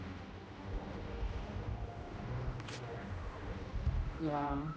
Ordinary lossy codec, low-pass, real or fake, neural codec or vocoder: none; none; fake; codec, 16 kHz, 1 kbps, X-Codec, HuBERT features, trained on general audio